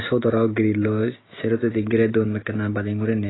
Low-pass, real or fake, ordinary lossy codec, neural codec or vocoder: 7.2 kHz; real; AAC, 16 kbps; none